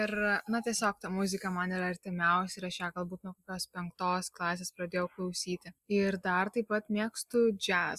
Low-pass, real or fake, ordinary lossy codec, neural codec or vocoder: 14.4 kHz; real; Opus, 64 kbps; none